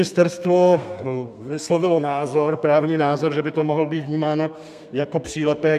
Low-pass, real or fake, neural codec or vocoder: 14.4 kHz; fake; codec, 32 kHz, 1.9 kbps, SNAC